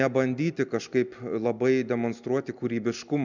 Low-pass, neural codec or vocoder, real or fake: 7.2 kHz; none; real